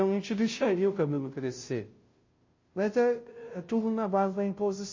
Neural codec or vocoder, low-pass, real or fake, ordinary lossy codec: codec, 16 kHz, 0.5 kbps, FunCodec, trained on Chinese and English, 25 frames a second; 7.2 kHz; fake; MP3, 32 kbps